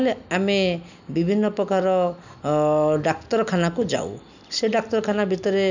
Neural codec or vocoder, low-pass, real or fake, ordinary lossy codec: none; 7.2 kHz; real; none